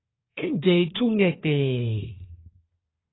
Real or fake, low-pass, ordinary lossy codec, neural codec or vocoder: fake; 7.2 kHz; AAC, 16 kbps; codec, 24 kHz, 1 kbps, SNAC